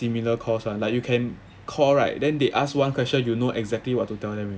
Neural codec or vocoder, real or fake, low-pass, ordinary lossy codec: none; real; none; none